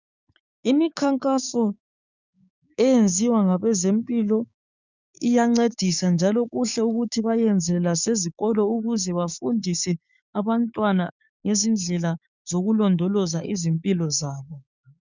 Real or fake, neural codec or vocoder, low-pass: fake; codec, 16 kHz, 6 kbps, DAC; 7.2 kHz